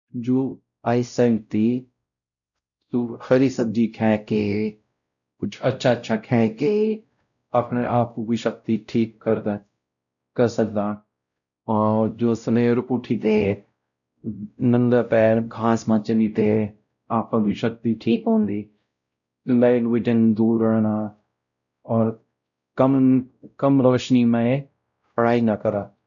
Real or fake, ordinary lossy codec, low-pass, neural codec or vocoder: fake; none; 7.2 kHz; codec, 16 kHz, 0.5 kbps, X-Codec, WavLM features, trained on Multilingual LibriSpeech